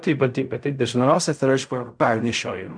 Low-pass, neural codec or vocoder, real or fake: 9.9 kHz; codec, 16 kHz in and 24 kHz out, 0.4 kbps, LongCat-Audio-Codec, fine tuned four codebook decoder; fake